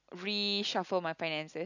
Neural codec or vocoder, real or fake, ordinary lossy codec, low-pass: none; real; none; 7.2 kHz